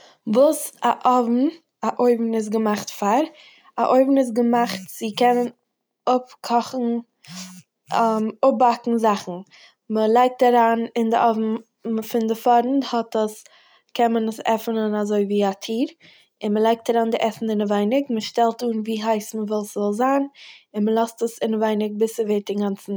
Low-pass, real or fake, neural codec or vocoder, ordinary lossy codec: none; real; none; none